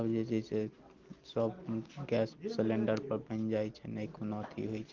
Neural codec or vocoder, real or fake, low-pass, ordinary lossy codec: none; real; 7.2 kHz; Opus, 16 kbps